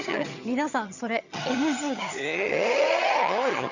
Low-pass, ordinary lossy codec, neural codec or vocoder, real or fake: 7.2 kHz; Opus, 64 kbps; vocoder, 22.05 kHz, 80 mel bands, HiFi-GAN; fake